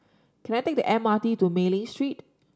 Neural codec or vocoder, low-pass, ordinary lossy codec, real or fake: none; none; none; real